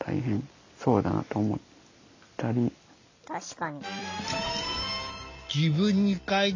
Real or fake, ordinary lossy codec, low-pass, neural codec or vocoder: real; AAC, 48 kbps; 7.2 kHz; none